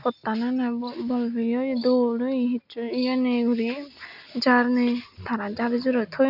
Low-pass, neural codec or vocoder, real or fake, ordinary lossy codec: 5.4 kHz; none; real; none